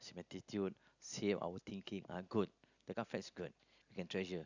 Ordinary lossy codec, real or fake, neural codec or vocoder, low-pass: none; real; none; 7.2 kHz